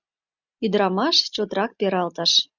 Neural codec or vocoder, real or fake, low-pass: none; real; 7.2 kHz